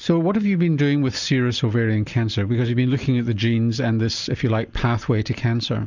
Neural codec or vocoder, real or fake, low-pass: none; real; 7.2 kHz